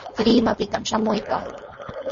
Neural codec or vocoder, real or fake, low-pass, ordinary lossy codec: codec, 16 kHz, 4.8 kbps, FACodec; fake; 7.2 kHz; MP3, 32 kbps